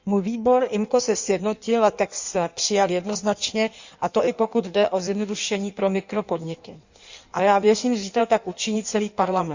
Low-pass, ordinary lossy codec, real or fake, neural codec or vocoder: 7.2 kHz; Opus, 64 kbps; fake; codec, 16 kHz in and 24 kHz out, 1.1 kbps, FireRedTTS-2 codec